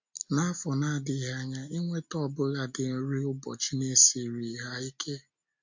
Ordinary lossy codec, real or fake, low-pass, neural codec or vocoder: MP3, 48 kbps; real; 7.2 kHz; none